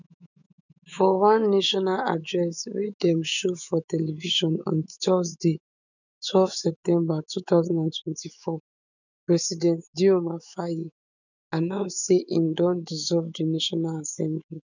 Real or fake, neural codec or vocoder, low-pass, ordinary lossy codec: fake; autoencoder, 48 kHz, 128 numbers a frame, DAC-VAE, trained on Japanese speech; 7.2 kHz; none